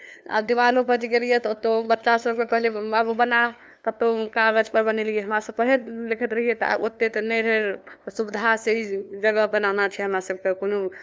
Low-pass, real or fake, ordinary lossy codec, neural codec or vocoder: none; fake; none; codec, 16 kHz, 2 kbps, FunCodec, trained on LibriTTS, 25 frames a second